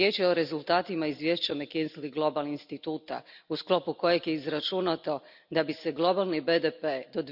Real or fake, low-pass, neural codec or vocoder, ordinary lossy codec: real; 5.4 kHz; none; none